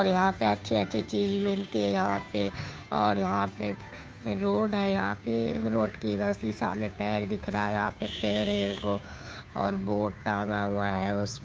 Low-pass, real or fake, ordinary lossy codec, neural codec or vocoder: none; fake; none; codec, 16 kHz, 2 kbps, FunCodec, trained on Chinese and English, 25 frames a second